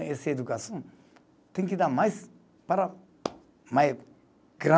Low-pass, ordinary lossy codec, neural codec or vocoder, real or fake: none; none; none; real